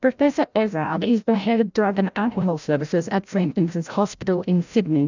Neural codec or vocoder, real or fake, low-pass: codec, 16 kHz, 0.5 kbps, FreqCodec, larger model; fake; 7.2 kHz